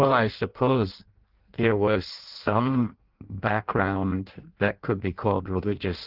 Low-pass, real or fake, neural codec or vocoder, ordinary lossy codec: 5.4 kHz; fake; codec, 16 kHz in and 24 kHz out, 0.6 kbps, FireRedTTS-2 codec; Opus, 16 kbps